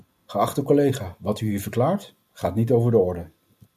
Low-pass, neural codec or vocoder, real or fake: 14.4 kHz; none; real